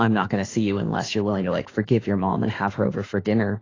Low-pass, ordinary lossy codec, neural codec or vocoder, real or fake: 7.2 kHz; AAC, 32 kbps; autoencoder, 48 kHz, 32 numbers a frame, DAC-VAE, trained on Japanese speech; fake